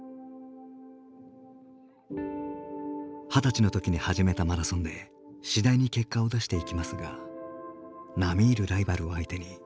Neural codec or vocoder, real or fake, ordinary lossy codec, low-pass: none; real; none; none